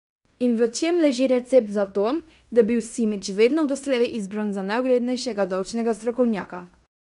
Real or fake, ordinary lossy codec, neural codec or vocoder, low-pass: fake; none; codec, 16 kHz in and 24 kHz out, 0.9 kbps, LongCat-Audio-Codec, fine tuned four codebook decoder; 10.8 kHz